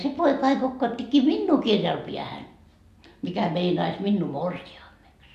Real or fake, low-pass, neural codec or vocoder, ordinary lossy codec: real; 14.4 kHz; none; none